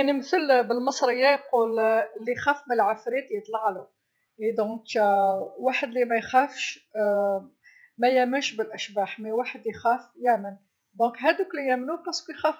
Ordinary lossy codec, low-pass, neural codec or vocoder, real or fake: none; none; vocoder, 48 kHz, 128 mel bands, Vocos; fake